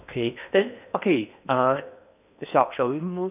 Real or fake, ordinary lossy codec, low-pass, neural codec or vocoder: fake; none; 3.6 kHz; codec, 16 kHz in and 24 kHz out, 0.8 kbps, FocalCodec, streaming, 65536 codes